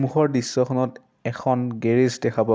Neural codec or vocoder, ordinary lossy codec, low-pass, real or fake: none; none; none; real